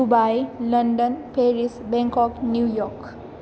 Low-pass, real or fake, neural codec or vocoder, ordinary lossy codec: none; real; none; none